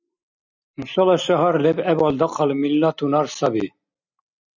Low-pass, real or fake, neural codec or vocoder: 7.2 kHz; real; none